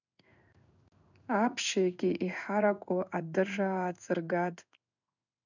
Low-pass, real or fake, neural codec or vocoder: 7.2 kHz; fake; codec, 16 kHz in and 24 kHz out, 1 kbps, XY-Tokenizer